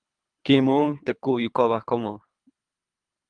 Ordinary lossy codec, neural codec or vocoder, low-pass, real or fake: Opus, 32 kbps; codec, 24 kHz, 3 kbps, HILCodec; 9.9 kHz; fake